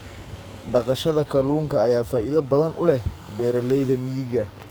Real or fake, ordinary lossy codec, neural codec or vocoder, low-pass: fake; none; codec, 44.1 kHz, 2.6 kbps, SNAC; none